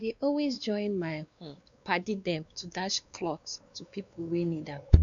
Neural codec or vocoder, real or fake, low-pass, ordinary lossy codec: codec, 16 kHz, 2 kbps, X-Codec, WavLM features, trained on Multilingual LibriSpeech; fake; 7.2 kHz; none